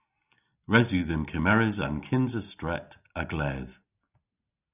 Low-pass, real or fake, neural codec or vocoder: 3.6 kHz; real; none